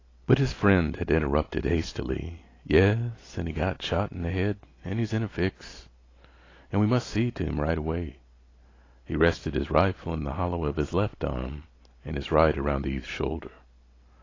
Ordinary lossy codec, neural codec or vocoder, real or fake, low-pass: AAC, 32 kbps; none; real; 7.2 kHz